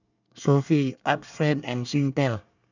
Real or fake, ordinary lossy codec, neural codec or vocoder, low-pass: fake; none; codec, 24 kHz, 1 kbps, SNAC; 7.2 kHz